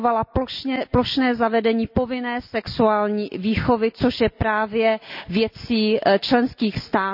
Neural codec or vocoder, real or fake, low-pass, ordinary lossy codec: none; real; 5.4 kHz; none